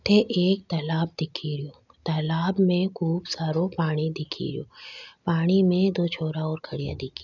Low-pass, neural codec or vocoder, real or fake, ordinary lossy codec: 7.2 kHz; none; real; none